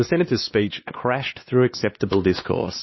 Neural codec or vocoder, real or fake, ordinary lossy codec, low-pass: codec, 16 kHz, 2 kbps, X-Codec, WavLM features, trained on Multilingual LibriSpeech; fake; MP3, 24 kbps; 7.2 kHz